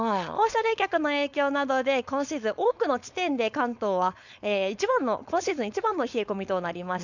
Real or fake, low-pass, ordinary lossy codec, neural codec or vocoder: fake; 7.2 kHz; none; codec, 16 kHz, 4.8 kbps, FACodec